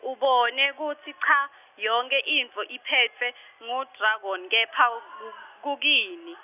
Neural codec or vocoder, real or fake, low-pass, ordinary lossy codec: none; real; 3.6 kHz; none